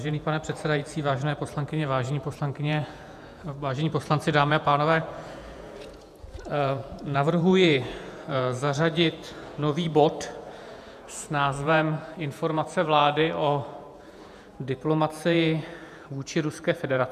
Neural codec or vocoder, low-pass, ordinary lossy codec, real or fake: vocoder, 48 kHz, 128 mel bands, Vocos; 14.4 kHz; MP3, 96 kbps; fake